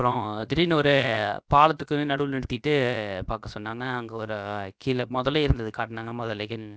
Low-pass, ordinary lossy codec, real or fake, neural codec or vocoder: none; none; fake; codec, 16 kHz, about 1 kbps, DyCAST, with the encoder's durations